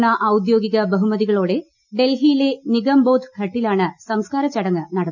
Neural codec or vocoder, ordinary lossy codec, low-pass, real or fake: none; none; 7.2 kHz; real